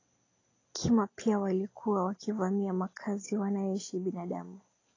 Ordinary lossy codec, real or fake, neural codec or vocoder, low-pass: AAC, 32 kbps; real; none; 7.2 kHz